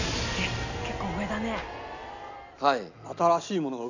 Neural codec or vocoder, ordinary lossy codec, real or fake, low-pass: none; none; real; 7.2 kHz